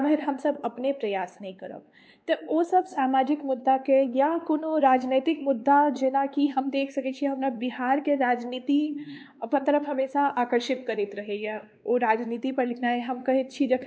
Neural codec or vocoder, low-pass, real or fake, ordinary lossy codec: codec, 16 kHz, 2 kbps, X-Codec, WavLM features, trained on Multilingual LibriSpeech; none; fake; none